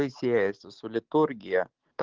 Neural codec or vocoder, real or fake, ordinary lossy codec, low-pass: none; real; Opus, 16 kbps; 7.2 kHz